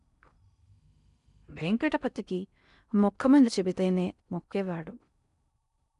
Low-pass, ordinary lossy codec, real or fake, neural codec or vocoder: 10.8 kHz; none; fake; codec, 16 kHz in and 24 kHz out, 0.6 kbps, FocalCodec, streaming, 4096 codes